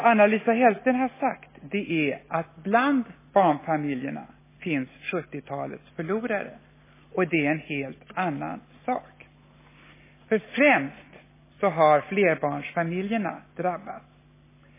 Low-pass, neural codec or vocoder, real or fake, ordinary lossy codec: 3.6 kHz; none; real; MP3, 16 kbps